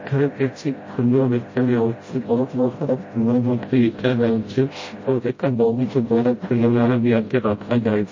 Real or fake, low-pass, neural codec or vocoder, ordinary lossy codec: fake; 7.2 kHz; codec, 16 kHz, 0.5 kbps, FreqCodec, smaller model; MP3, 32 kbps